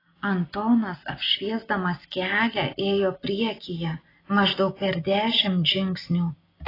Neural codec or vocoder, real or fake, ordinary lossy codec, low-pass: none; real; AAC, 24 kbps; 5.4 kHz